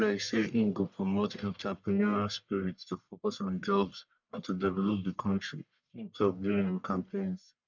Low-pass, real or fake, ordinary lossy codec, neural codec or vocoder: 7.2 kHz; fake; none; codec, 44.1 kHz, 1.7 kbps, Pupu-Codec